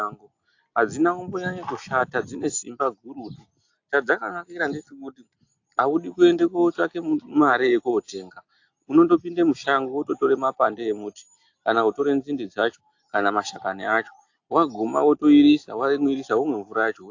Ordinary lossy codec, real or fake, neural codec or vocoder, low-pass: AAC, 48 kbps; real; none; 7.2 kHz